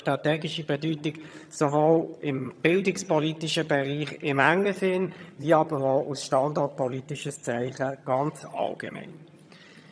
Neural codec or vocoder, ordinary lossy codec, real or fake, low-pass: vocoder, 22.05 kHz, 80 mel bands, HiFi-GAN; none; fake; none